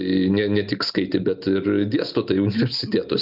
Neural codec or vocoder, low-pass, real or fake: none; 5.4 kHz; real